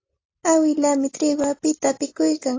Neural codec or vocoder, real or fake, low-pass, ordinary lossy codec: none; real; 7.2 kHz; AAC, 32 kbps